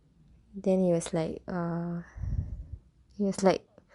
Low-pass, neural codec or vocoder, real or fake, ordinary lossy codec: 10.8 kHz; none; real; Opus, 64 kbps